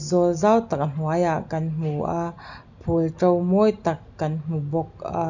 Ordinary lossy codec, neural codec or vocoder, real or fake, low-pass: none; none; real; 7.2 kHz